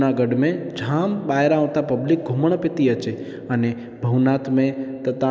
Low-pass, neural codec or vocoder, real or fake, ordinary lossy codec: none; none; real; none